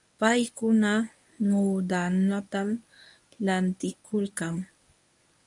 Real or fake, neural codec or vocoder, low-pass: fake; codec, 24 kHz, 0.9 kbps, WavTokenizer, medium speech release version 1; 10.8 kHz